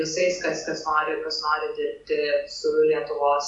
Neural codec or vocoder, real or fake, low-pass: none; real; 10.8 kHz